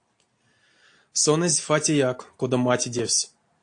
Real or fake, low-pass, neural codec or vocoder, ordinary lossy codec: real; 9.9 kHz; none; AAC, 48 kbps